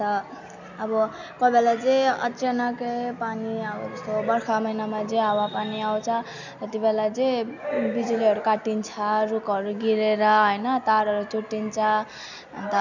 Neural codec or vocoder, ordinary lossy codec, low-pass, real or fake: none; none; 7.2 kHz; real